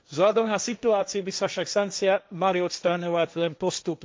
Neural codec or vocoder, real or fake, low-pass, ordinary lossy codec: codec, 16 kHz, 1.1 kbps, Voila-Tokenizer; fake; none; none